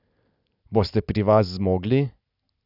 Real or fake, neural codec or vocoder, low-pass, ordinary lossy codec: real; none; 5.4 kHz; none